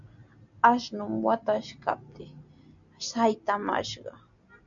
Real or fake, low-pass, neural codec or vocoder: real; 7.2 kHz; none